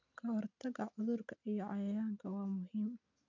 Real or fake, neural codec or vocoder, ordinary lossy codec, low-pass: real; none; none; 7.2 kHz